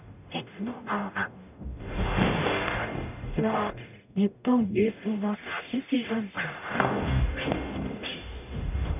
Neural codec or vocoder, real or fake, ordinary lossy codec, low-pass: codec, 44.1 kHz, 0.9 kbps, DAC; fake; none; 3.6 kHz